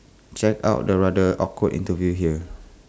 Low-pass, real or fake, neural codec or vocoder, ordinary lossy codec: none; real; none; none